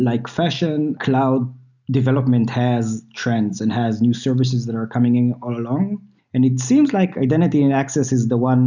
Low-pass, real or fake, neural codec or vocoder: 7.2 kHz; real; none